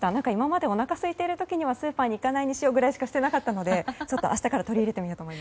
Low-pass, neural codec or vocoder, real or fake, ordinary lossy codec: none; none; real; none